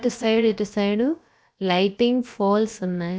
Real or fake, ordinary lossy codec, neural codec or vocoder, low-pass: fake; none; codec, 16 kHz, about 1 kbps, DyCAST, with the encoder's durations; none